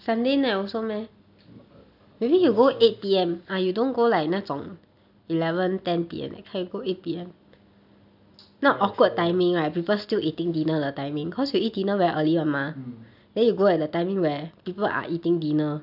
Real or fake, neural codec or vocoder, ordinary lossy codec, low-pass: real; none; none; 5.4 kHz